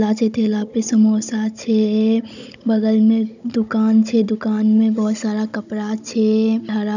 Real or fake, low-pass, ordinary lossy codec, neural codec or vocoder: fake; 7.2 kHz; none; codec, 16 kHz, 16 kbps, FunCodec, trained on Chinese and English, 50 frames a second